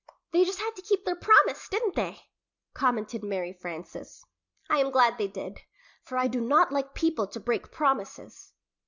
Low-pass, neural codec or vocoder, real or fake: 7.2 kHz; none; real